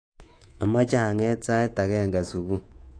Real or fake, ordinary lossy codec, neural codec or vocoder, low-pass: fake; AAC, 48 kbps; codec, 24 kHz, 3.1 kbps, DualCodec; 9.9 kHz